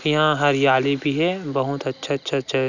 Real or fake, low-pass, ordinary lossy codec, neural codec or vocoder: real; 7.2 kHz; none; none